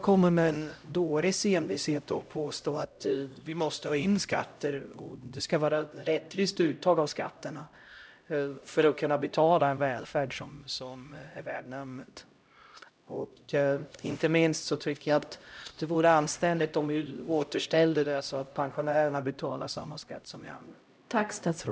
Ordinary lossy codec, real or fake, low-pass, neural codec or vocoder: none; fake; none; codec, 16 kHz, 0.5 kbps, X-Codec, HuBERT features, trained on LibriSpeech